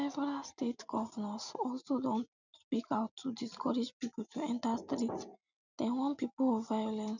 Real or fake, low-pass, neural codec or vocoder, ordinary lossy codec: real; 7.2 kHz; none; none